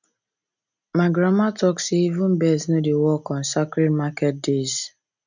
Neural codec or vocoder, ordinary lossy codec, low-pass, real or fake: none; none; 7.2 kHz; real